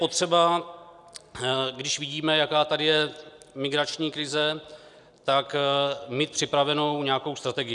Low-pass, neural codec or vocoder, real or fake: 10.8 kHz; none; real